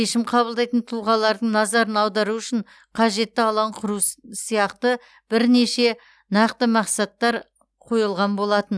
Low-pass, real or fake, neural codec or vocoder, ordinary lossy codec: none; real; none; none